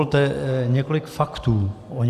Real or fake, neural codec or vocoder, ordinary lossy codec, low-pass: real; none; AAC, 96 kbps; 14.4 kHz